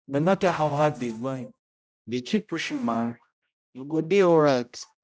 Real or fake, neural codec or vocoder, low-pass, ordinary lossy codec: fake; codec, 16 kHz, 0.5 kbps, X-Codec, HuBERT features, trained on general audio; none; none